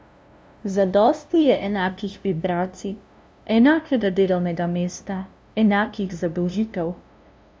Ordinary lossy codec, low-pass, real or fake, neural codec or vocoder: none; none; fake; codec, 16 kHz, 0.5 kbps, FunCodec, trained on LibriTTS, 25 frames a second